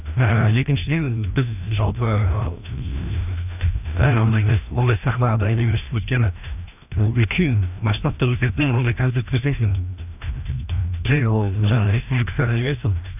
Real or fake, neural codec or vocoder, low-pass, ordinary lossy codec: fake; codec, 16 kHz, 1 kbps, FreqCodec, larger model; 3.6 kHz; none